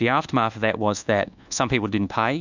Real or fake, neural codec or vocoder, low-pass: fake; codec, 24 kHz, 1.2 kbps, DualCodec; 7.2 kHz